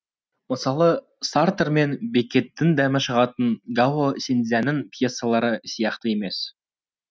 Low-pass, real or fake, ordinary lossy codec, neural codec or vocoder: none; real; none; none